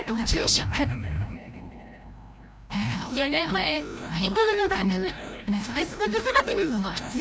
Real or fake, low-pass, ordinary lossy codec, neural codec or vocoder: fake; none; none; codec, 16 kHz, 0.5 kbps, FreqCodec, larger model